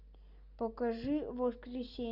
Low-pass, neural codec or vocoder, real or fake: 5.4 kHz; none; real